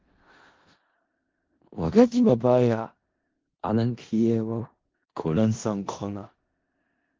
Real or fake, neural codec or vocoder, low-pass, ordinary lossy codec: fake; codec, 16 kHz in and 24 kHz out, 0.4 kbps, LongCat-Audio-Codec, four codebook decoder; 7.2 kHz; Opus, 16 kbps